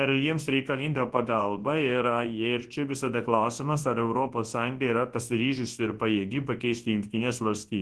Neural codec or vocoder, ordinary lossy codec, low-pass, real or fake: codec, 24 kHz, 0.9 kbps, WavTokenizer, large speech release; Opus, 16 kbps; 10.8 kHz; fake